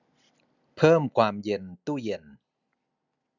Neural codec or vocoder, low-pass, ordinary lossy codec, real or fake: none; 7.2 kHz; none; real